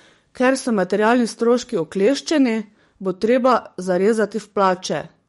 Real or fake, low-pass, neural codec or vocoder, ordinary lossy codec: fake; 19.8 kHz; codec, 44.1 kHz, 7.8 kbps, DAC; MP3, 48 kbps